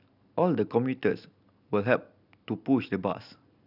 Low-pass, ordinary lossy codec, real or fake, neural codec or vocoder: 5.4 kHz; none; real; none